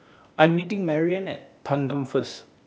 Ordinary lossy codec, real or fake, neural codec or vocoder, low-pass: none; fake; codec, 16 kHz, 0.8 kbps, ZipCodec; none